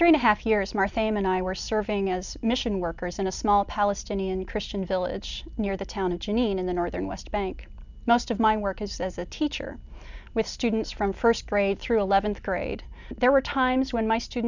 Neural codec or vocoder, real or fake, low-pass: none; real; 7.2 kHz